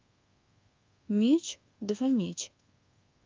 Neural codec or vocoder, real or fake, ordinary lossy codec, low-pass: codec, 24 kHz, 1.2 kbps, DualCodec; fake; Opus, 24 kbps; 7.2 kHz